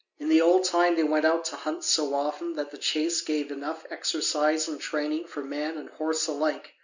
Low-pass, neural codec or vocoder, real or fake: 7.2 kHz; none; real